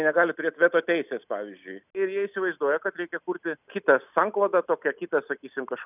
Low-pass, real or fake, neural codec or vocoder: 3.6 kHz; real; none